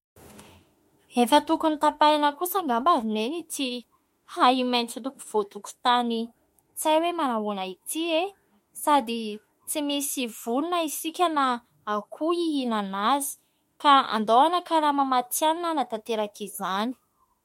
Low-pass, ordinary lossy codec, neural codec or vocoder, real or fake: 19.8 kHz; MP3, 64 kbps; autoencoder, 48 kHz, 32 numbers a frame, DAC-VAE, trained on Japanese speech; fake